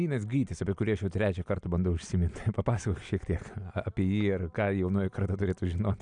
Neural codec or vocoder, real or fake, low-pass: none; real; 9.9 kHz